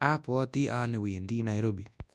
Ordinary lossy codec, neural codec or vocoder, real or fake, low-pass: none; codec, 24 kHz, 0.9 kbps, WavTokenizer, large speech release; fake; none